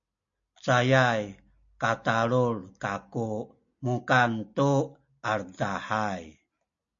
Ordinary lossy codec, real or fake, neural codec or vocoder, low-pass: MP3, 48 kbps; real; none; 7.2 kHz